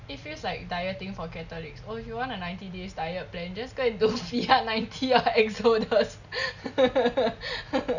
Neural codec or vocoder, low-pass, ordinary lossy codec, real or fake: none; 7.2 kHz; none; real